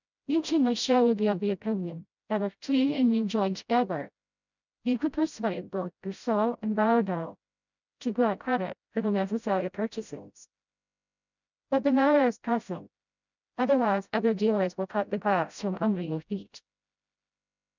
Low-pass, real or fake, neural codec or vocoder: 7.2 kHz; fake; codec, 16 kHz, 0.5 kbps, FreqCodec, smaller model